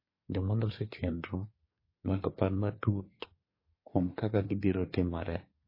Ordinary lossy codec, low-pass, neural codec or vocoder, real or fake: MP3, 24 kbps; 5.4 kHz; codec, 24 kHz, 1 kbps, SNAC; fake